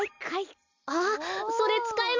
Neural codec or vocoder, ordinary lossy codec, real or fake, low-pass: none; MP3, 64 kbps; real; 7.2 kHz